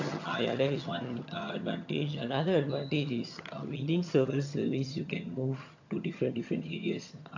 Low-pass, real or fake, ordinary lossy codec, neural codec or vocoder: 7.2 kHz; fake; AAC, 48 kbps; vocoder, 22.05 kHz, 80 mel bands, HiFi-GAN